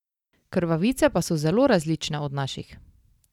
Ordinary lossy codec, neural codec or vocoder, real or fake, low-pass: none; none; real; 19.8 kHz